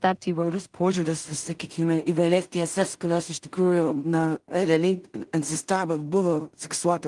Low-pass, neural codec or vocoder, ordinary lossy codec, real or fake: 10.8 kHz; codec, 16 kHz in and 24 kHz out, 0.4 kbps, LongCat-Audio-Codec, two codebook decoder; Opus, 24 kbps; fake